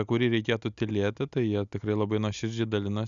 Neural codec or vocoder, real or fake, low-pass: none; real; 7.2 kHz